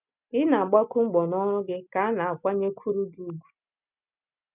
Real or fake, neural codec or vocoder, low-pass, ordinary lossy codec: real; none; 3.6 kHz; none